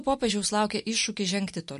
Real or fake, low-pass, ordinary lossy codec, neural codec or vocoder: real; 14.4 kHz; MP3, 48 kbps; none